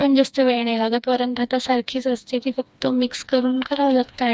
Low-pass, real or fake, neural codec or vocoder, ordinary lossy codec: none; fake; codec, 16 kHz, 2 kbps, FreqCodec, smaller model; none